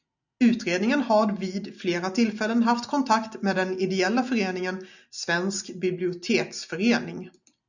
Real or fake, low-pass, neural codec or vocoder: real; 7.2 kHz; none